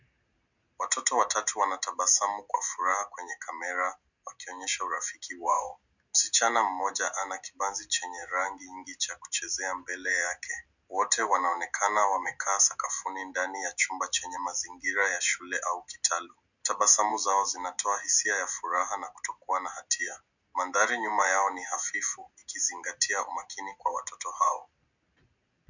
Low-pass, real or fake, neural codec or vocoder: 7.2 kHz; real; none